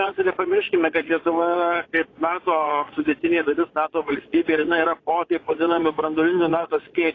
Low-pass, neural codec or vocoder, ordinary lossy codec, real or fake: 7.2 kHz; vocoder, 22.05 kHz, 80 mel bands, Vocos; AAC, 32 kbps; fake